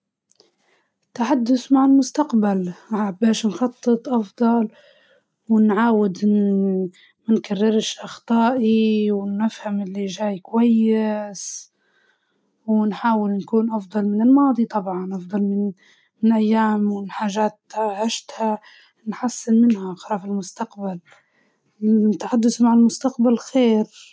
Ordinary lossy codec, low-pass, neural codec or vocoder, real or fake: none; none; none; real